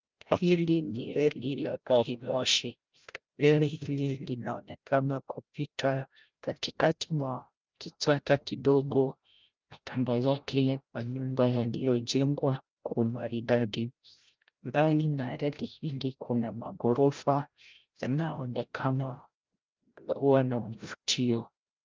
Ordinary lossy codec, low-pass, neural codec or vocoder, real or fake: Opus, 32 kbps; 7.2 kHz; codec, 16 kHz, 0.5 kbps, FreqCodec, larger model; fake